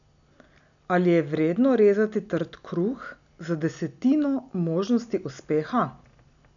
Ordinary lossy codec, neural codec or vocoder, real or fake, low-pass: none; none; real; 7.2 kHz